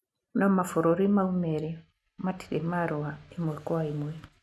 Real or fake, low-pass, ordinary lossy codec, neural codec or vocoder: real; none; none; none